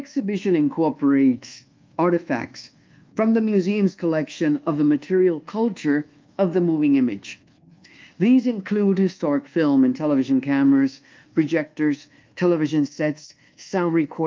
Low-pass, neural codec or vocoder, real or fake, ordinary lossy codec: 7.2 kHz; codec, 24 kHz, 1.2 kbps, DualCodec; fake; Opus, 24 kbps